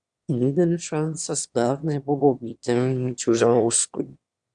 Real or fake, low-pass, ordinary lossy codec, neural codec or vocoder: fake; 9.9 kHz; Opus, 64 kbps; autoencoder, 22.05 kHz, a latent of 192 numbers a frame, VITS, trained on one speaker